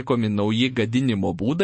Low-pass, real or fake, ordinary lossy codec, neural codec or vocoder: 9.9 kHz; fake; MP3, 32 kbps; autoencoder, 48 kHz, 128 numbers a frame, DAC-VAE, trained on Japanese speech